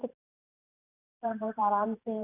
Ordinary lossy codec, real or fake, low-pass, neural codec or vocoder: AAC, 16 kbps; fake; 3.6 kHz; vocoder, 44.1 kHz, 128 mel bands every 512 samples, BigVGAN v2